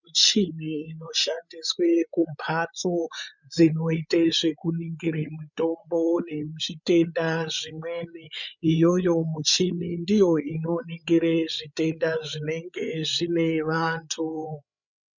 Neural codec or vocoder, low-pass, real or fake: codec, 16 kHz, 8 kbps, FreqCodec, larger model; 7.2 kHz; fake